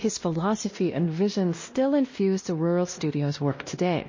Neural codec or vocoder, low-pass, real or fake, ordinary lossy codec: codec, 16 kHz, 1 kbps, X-Codec, WavLM features, trained on Multilingual LibriSpeech; 7.2 kHz; fake; MP3, 32 kbps